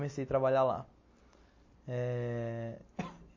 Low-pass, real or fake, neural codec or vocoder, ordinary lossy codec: 7.2 kHz; real; none; MP3, 32 kbps